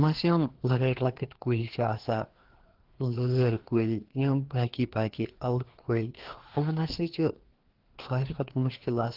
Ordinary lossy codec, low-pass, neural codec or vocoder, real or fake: Opus, 16 kbps; 5.4 kHz; codec, 16 kHz, 2 kbps, FreqCodec, larger model; fake